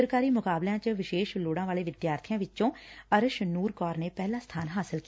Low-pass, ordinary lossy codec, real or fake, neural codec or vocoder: none; none; real; none